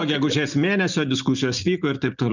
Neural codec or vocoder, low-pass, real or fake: none; 7.2 kHz; real